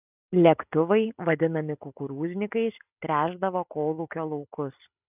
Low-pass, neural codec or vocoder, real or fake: 3.6 kHz; none; real